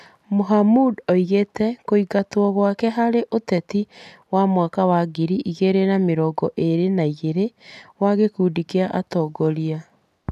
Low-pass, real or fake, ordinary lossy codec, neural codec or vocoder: 14.4 kHz; real; none; none